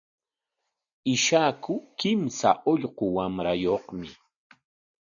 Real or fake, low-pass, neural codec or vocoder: real; 7.2 kHz; none